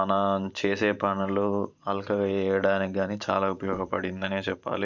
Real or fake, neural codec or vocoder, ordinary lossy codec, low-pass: fake; vocoder, 44.1 kHz, 128 mel bands every 512 samples, BigVGAN v2; none; 7.2 kHz